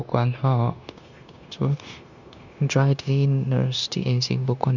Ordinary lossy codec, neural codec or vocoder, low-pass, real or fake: none; codec, 16 kHz, 0.9 kbps, LongCat-Audio-Codec; 7.2 kHz; fake